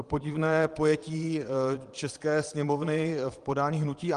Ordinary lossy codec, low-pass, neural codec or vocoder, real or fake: Opus, 24 kbps; 9.9 kHz; vocoder, 22.05 kHz, 80 mel bands, Vocos; fake